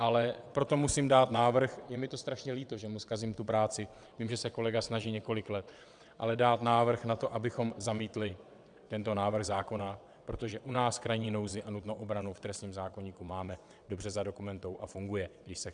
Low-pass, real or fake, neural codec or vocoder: 9.9 kHz; fake; vocoder, 22.05 kHz, 80 mel bands, WaveNeXt